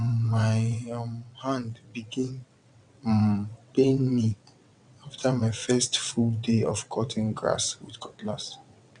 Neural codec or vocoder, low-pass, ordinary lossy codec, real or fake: vocoder, 22.05 kHz, 80 mel bands, WaveNeXt; 9.9 kHz; none; fake